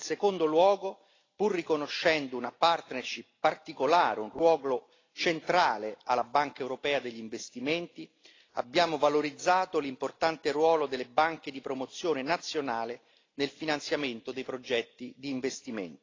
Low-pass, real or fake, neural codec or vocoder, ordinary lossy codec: 7.2 kHz; real; none; AAC, 32 kbps